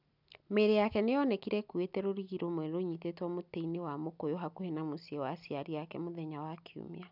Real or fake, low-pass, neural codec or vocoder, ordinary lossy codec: real; 5.4 kHz; none; none